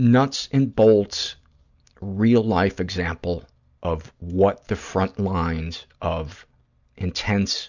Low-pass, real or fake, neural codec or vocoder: 7.2 kHz; real; none